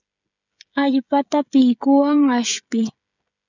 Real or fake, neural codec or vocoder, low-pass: fake; codec, 16 kHz, 16 kbps, FreqCodec, smaller model; 7.2 kHz